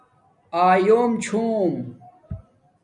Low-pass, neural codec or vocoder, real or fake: 10.8 kHz; none; real